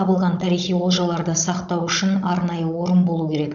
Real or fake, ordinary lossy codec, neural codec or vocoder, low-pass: fake; MP3, 96 kbps; codec, 16 kHz, 16 kbps, FunCodec, trained on Chinese and English, 50 frames a second; 7.2 kHz